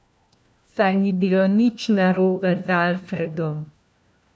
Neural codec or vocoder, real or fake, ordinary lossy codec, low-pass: codec, 16 kHz, 1 kbps, FunCodec, trained on LibriTTS, 50 frames a second; fake; none; none